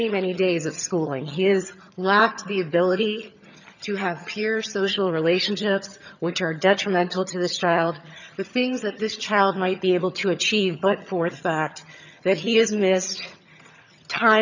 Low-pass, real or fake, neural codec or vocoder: 7.2 kHz; fake; vocoder, 22.05 kHz, 80 mel bands, HiFi-GAN